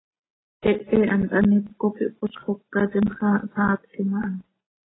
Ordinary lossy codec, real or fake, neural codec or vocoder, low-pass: AAC, 16 kbps; real; none; 7.2 kHz